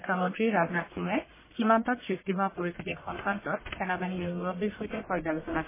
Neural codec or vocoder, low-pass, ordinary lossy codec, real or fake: codec, 44.1 kHz, 1.7 kbps, Pupu-Codec; 3.6 kHz; MP3, 16 kbps; fake